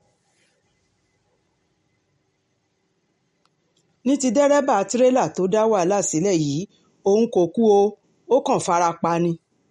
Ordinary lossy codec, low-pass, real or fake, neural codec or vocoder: MP3, 48 kbps; 19.8 kHz; real; none